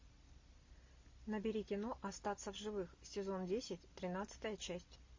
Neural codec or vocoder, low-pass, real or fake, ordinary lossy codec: none; 7.2 kHz; real; MP3, 32 kbps